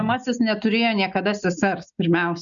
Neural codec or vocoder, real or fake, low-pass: none; real; 7.2 kHz